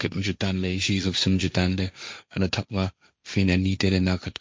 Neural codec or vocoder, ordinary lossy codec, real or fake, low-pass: codec, 16 kHz, 1.1 kbps, Voila-Tokenizer; none; fake; none